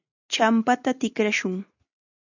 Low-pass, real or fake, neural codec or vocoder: 7.2 kHz; real; none